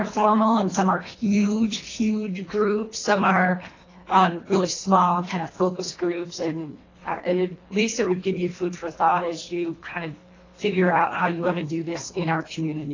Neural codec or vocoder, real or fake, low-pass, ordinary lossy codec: codec, 24 kHz, 1.5 kbps, HILCodec; fake; 7.2 kHz; AAC, 32 kbps